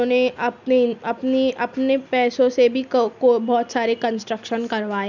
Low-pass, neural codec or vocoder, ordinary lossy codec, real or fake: 7.2 kHz; none; none; real